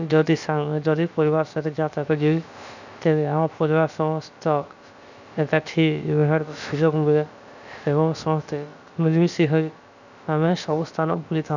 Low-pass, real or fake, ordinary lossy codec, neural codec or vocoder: 7.2 kHz; fake; none; codec, 16 kHz, about 1 kbps, DyCAST, with the encoder's durations